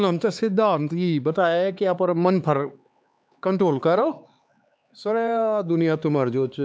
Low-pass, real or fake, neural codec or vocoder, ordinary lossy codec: none; fake; codec, 16 kHz, 4 kbps, X-Codec, HuBERT features, trained on LibriSpeech; none